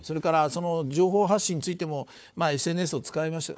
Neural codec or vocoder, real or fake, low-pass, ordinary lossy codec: codec, 16 kHz, 4 kbps, FunCodec, trained on Chinese and English, 50 frames a second; fake; none; none